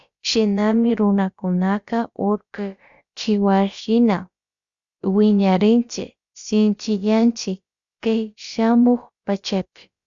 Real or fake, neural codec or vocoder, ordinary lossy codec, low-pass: fake; codec, 16 kHz, about 1 kbps, DyCAST, with the encoder's durations; Opus, 64 kbps; 7.2 kHz